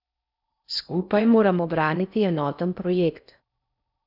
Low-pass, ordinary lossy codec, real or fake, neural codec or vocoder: 5.4 kHz; none; fake; codec, 16 kHz in and 24 kHz out, 0.6 kbps, FocalCodec, streaming, 4096 codes